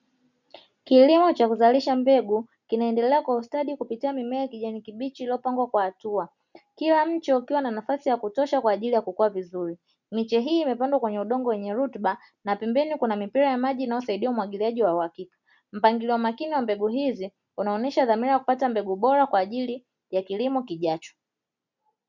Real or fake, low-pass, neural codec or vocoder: real; 7.2 kHz; none